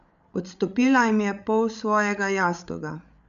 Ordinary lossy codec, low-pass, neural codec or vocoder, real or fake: none; 7.2 kHz; codec, 16 kHz, 8 kbps, FreqCodec, larger model; fake